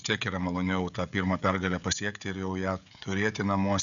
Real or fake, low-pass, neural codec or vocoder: fake; 7.2 kHz; codec, 16 kHz, 16 kbps, FreqCodec, smaller model